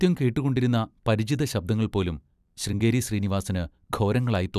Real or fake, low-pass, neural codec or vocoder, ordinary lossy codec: fake; 14.4 kHz; vocoder, 44.1 kHz, 128 mel bands every 256 samples, BigVGAN v2; none